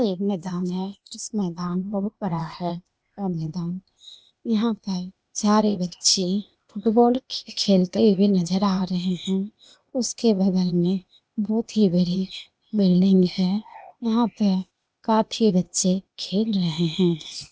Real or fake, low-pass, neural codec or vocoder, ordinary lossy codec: fake; none; codec, 16 kHz, 0.8 kbps, ZipCodec; none